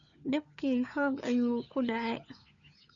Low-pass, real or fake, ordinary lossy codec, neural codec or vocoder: 7.2 kHz; fake; none; codec, 16 kHz, 4 kbps, FunCodec, trained on LibriTTS, 50 frames a second